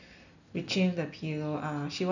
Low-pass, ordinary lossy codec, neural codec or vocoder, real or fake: 7.2 kHz; none; none; real